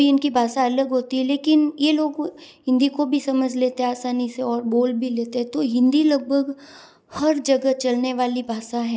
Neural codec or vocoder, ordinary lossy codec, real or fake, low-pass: none; none; real; none